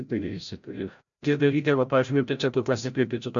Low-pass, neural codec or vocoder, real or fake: 7.2 kHz; codec, 16 kHz, 0.5 kbps, FreqCodec, larger model; fake